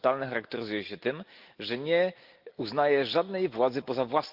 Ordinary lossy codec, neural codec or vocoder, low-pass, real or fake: Opus, 32 kbps; none; 5.4 kHz; real